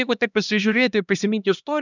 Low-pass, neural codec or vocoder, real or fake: 7.2 kHz; codec, 16 kHz, 1 kbps, X-Codec, HuBERT features, trained on LibriSpeech; fake